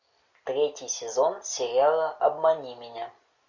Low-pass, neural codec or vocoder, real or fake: 7.2 kHz; none; real